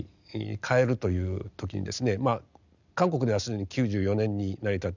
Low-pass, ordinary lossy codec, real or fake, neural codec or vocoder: 7.2 kHz; none; real; none